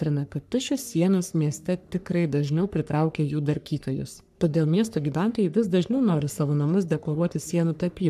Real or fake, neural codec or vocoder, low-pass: fake; codec, 44.1 kHz, 3.4 kbps, Pupu-Codec; 14.4 kHz